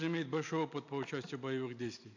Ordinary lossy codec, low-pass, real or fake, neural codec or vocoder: AAC, 48 kbps; 7.2 kHz; real; none